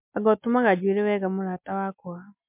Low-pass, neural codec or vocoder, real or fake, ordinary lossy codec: 3.6 kHz; none; real; MP3, 32 kbps